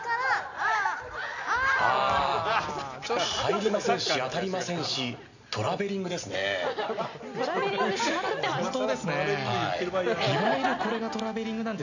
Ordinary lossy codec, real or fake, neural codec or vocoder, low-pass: none; real; none; 7.2 kHz